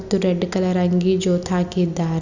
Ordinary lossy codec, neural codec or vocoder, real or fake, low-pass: none; none; real; 7.2 kHz